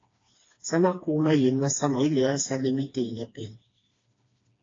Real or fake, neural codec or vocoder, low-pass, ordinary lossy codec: fake; codec, 16 kHz, 2 kbps, FreqCodec, smaller model; 7.2 kHz; AAC, 32 kbps